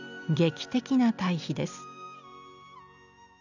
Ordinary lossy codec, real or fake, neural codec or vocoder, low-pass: none; real; none; 7.2 kHz